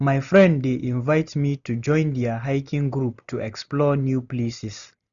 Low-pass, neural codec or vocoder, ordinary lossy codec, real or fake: 7.2 kHz; none; none; real